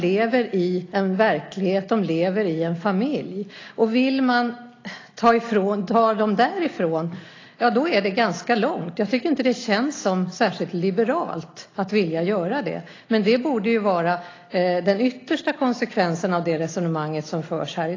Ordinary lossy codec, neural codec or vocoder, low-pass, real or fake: AAC, 32 kbps; none; 7.2 kHz; real